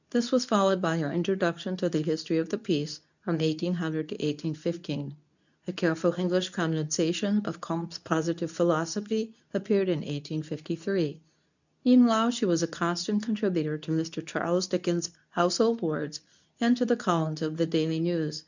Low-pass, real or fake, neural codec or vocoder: 7.2 kHz; fake; codec, 24 kHz, 0.9 kbps, WavTokenizer, medium speech release version 2